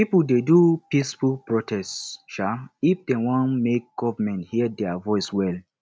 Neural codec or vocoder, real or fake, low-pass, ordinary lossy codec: none; real; none; none